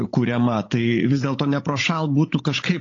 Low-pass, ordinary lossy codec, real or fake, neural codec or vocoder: 7.2 kHz; AAC, 32 kbps; fake; codec, 16 kHz, 16 kbps, FunCodec, trained on Chinese and English, 50 frames a second